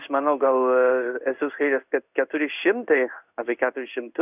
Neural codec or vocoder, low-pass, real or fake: codec, 16 kHz in and 24 kHz out, 1 kbps, XY-Tokenizer; 3.6 kHz; fake